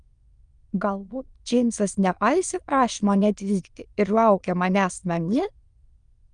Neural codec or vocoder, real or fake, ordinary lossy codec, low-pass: autoencoder, 22.05 kHz, a latent of 192 numbers a frame, VITS, trained on many speakers; fake; Opus, 32 kbps; 9.9 kHz